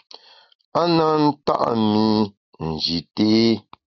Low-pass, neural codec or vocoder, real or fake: 7.2 kHz; none; real